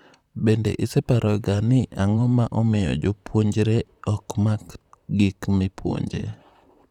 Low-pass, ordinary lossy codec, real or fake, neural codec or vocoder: 19.8 kHz; none; fake; vocoder, 44.1 kHz, 128 mel bands, Pupu-Vocoder